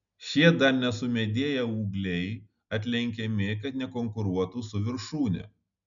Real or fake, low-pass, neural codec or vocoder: real; 7.2 kHz; none